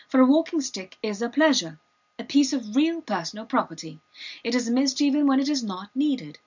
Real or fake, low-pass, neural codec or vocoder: real; 7.2 kHz; none